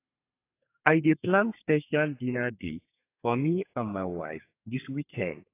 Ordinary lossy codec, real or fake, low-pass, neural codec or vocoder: AAC, 24 kbps; fake; 3.6 kHz; codec, 32 kHz, 1.9 kbps, SNAC